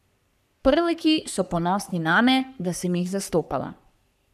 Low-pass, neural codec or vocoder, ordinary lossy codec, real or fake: 14.4 kHz; codec, 44.1 kHz, 3.4 kbps, Pupu-Codec; none; fake